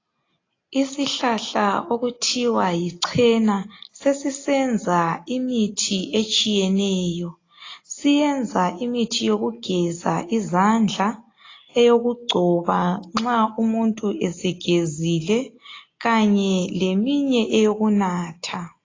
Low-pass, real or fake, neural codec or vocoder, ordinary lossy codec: 7.2 kHz; real; none; AAC, 32 kbps